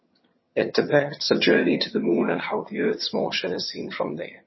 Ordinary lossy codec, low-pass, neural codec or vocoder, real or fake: MP3, 24 kbps; 7.2 kHz; vocoder, 22.05 kHz, 80 mel bands, HiFi-GAN; fake